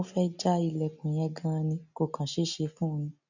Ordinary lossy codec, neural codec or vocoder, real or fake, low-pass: none; none; real; 7.2 kHz